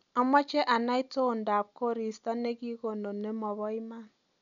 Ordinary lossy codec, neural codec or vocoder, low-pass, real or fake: none; none; 7.2 kHz; real